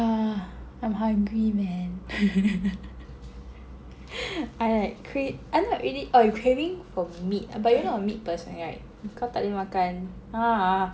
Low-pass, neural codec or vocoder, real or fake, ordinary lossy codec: none; none; real; none